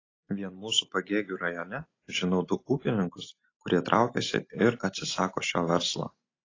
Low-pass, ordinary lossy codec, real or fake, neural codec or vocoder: 7.2 kHz; AAC, 32 kbps; real; none